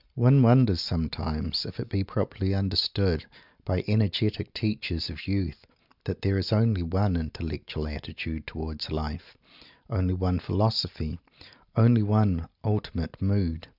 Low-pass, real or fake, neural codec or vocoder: 5.4 kHz; real; none